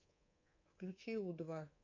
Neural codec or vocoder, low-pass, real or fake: codec, 24 kHz, 3.1 kbps, DualCodec; 7.2 kHz; fake